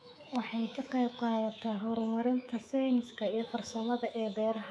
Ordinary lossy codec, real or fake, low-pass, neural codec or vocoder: none; fake; none; codec, 24 kHz, 3.1 kbps, DualCodec